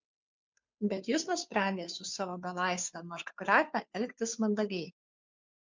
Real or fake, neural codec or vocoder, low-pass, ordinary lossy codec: fake; codec, 16 kHz, 2 kbps, FunCodec, trained on Chinese and English, 25 frames a second; 7.2 kHz; MP3, 64 kbps